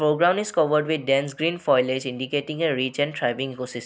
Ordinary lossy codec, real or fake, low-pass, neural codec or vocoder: none; real; none; none